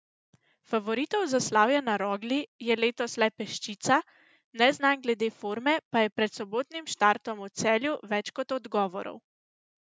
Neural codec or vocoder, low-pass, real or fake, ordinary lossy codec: none; none; real; none